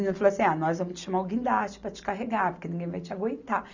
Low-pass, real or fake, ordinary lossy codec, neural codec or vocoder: 7.2 kHz; real; none; none